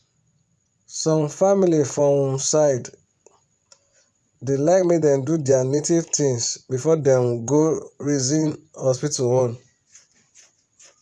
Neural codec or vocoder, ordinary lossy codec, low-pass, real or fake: vocoder, 24 kHz, 100 mel bands, Vocos; none; none; fake